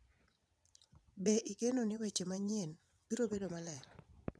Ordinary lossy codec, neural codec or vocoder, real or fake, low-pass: none; vocoder, 22.05 kHz, 80 mel bands, Vocos; fake; none